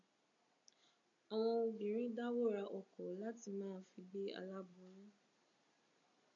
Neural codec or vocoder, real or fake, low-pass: none; real; 7.2 kHz